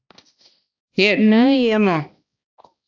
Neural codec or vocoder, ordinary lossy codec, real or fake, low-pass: codec, 16 kHz, 1 kbps, X-Codec, HuBERT features, trained on balanced general audio; AAC, 48 kbps; fake; 7.2 kHz